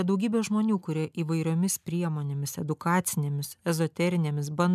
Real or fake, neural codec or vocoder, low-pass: real; none; 14.4 kHz